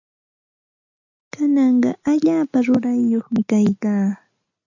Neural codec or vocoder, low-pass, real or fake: none; 7.2 kHz; real